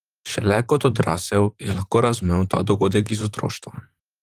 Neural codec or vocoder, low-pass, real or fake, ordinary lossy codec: vocoder, 44.1 kHz, 128 mel bands, Pupu-Vocoder; 14.4 kHz; fake; Opus, 24 kbps